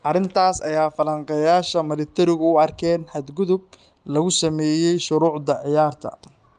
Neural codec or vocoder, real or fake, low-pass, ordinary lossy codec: none; real; 10.8 kHz; Opus, 32 kbps